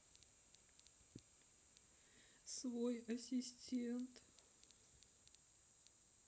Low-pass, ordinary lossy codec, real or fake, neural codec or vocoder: none; none; real; none